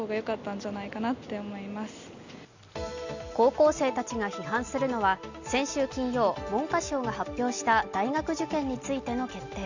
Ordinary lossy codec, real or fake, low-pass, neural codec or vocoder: Opus, 64 kbps; real; 7.2 kHz; none